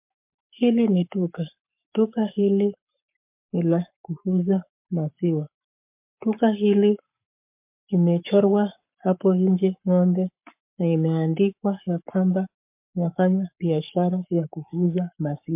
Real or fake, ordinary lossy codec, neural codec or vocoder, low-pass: fake; MP3, 32 kbps; codec, 44.1 kHz, 7.8 kbps, Pupu-Codec; 3.6 kHz